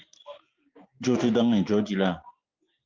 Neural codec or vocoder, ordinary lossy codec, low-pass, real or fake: none; Opus, 24 kbps; 7.2 kHz; real